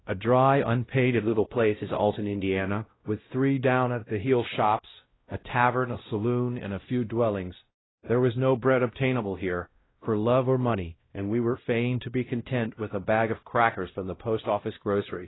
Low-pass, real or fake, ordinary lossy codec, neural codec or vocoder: 7.2 kHz; fake; AAC, 16 kbps; codec, 16 kHz, 0.5 kbps, X-Codec, WavLM features, trained on Multilingual LibriSpeech